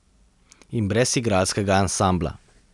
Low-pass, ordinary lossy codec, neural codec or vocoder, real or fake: 10.8 kHz; none; none; real